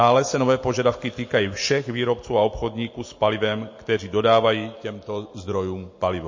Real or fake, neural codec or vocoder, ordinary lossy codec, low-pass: real; none; MP3, 32 kbps; 7.2 kHz